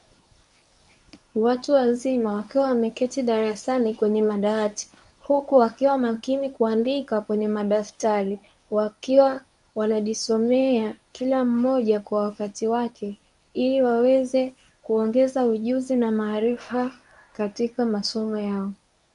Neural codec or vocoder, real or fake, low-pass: codec, 24 kHz, 0.9 kbps, WavTokenizer, medium speech release version 1; fake; 10.8 kHz